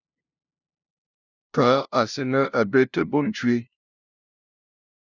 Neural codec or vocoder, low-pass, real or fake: codec, 16 kHz, 0.5 kbps, FunCodec, trained on LibriTTS, 25 frames a second; 7.2 kHz; fake